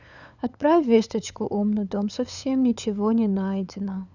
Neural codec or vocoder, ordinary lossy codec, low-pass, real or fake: codec, 16 kHz, 8 kbps, FunCodec, trained on LibriTTS, 25 frames a second; none; 7.2 kHz; fake